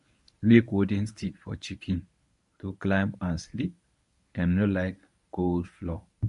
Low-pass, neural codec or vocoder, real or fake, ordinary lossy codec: 10.8 kHz; codec, 24 kHz, 0.9 kbps, WavTokenizer, medium speech release version 1; fake; MP3, 64 kbps